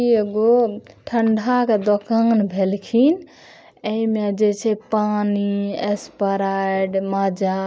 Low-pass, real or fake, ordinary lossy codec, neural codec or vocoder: none; real; none; none